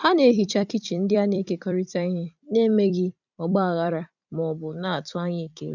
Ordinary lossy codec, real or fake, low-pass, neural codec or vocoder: none; fake; 7.2 kHz; vocoder, 44.1 kHz, 128 mel bands every 256 samples, BigVGAN v2